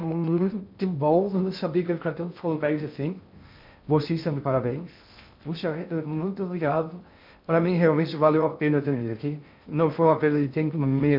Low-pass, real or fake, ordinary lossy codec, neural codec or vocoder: 5.4 kHz; fake; MP3, 48 kbps; codec, 16 kHz in and 24 kHz out, 0.6 kbps, FocalCodec, streaming, 2048 codes